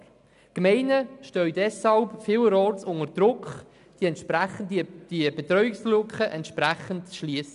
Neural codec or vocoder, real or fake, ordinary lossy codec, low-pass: none; real; none; 10.8 kHz